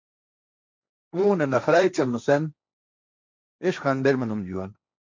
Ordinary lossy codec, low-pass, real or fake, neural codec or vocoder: MP3, 64 kbps; 7.2 kHz; fake; codec, 16 kHz, 1.1 kbps, Voila-Tokenizer